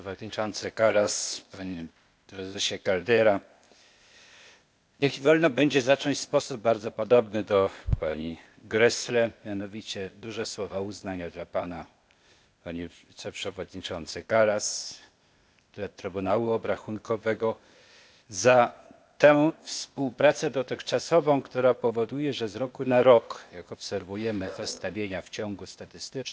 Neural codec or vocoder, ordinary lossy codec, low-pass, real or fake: codec, 16 kHz, 0.8 kbps, ZipCodec; none; none; fake